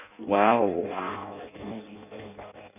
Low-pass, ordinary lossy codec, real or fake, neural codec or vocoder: 3.6 kHz; none; fake; codec, 16 kHz in and 24 kHz out, 0.6 kbps, FireRedTTS-2 codec